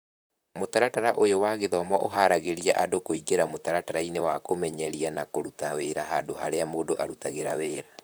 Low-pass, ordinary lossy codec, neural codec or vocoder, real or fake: none; none; vocoder, 44.1 kHz, 128 mel bands, Pupu-Vocoder; fake